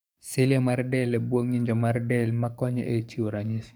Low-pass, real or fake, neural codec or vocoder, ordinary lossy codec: none; fake; codec, 44.1 kHz, 7.8 kbps, DAC; none